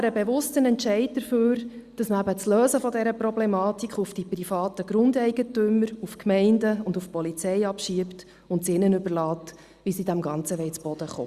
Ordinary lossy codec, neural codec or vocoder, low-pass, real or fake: Opus, 64 kbps; none; 14.4 kHz; real